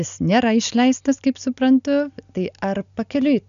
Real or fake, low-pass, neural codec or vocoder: real; 7.2 kHz; none